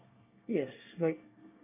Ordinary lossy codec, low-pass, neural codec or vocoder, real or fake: none; 3.6 kHz; codec, 24 kHz, 1 kbps, SNAC; fake